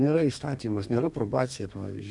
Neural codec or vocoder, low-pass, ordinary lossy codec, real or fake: codec, 32 kHz, 1.9 kbps, SNAC; 10.8 kHz; MP3, 96 kbps; fake